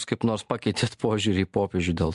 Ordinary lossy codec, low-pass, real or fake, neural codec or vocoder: MP3, 64 kbps; 10.8 kHz; real; none